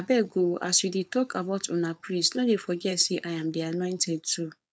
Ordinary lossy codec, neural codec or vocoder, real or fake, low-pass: none; codec, 16 kHz, 4.8 kbps, FACodec; fake; none